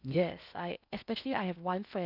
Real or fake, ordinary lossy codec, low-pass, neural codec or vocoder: fake; none; 5.4 kHz; codec, 16 kHz in and 24 kHz out, 0.8 kbps, FocalCodec, streaming, 65536 codes